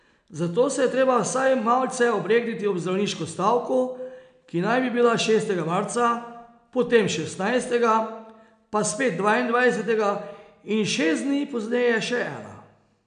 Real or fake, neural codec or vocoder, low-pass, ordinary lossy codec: real; none; 9.9 kHz; none